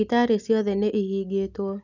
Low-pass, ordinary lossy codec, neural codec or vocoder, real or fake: 7.2 kHz; none; none; real